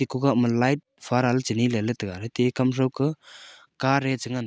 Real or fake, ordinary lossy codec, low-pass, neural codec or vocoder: real; none; none; none